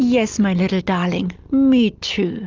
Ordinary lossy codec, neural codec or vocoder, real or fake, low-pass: Opus, 24 kbps; none; real; 7.2 kHz